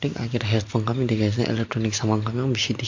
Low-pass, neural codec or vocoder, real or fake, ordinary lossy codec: 7.2 kHz; none; real; MP3, 48 kbps